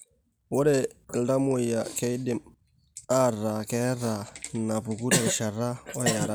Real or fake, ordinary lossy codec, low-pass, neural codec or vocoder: real; none; none; none